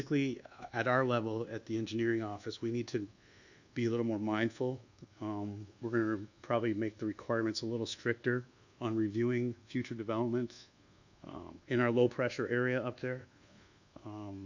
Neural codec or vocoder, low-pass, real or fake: codec, 24 kHz, 1.2 kbps, DualCodec; 7.2 kHz; fake